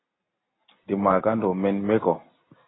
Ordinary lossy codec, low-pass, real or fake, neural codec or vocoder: AAC, 16 kbps; 7.2 kHz; real; none